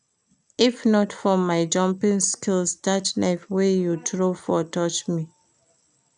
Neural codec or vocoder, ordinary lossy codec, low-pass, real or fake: none; none; 9.9 kHz; real